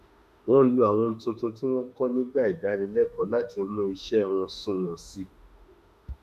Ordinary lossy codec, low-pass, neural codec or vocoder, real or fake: none; 14.4 kHz; autoencoder, 48 kHz, 32 numbers a frame, DAC-VAE, trained on Japanese speech; fake